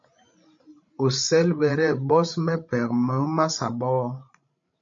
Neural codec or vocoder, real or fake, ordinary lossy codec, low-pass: codec, 16 kHz, 16 kbps, FreqCodec, larger model; fake; MP3, 48 kbps; 7.2 kHz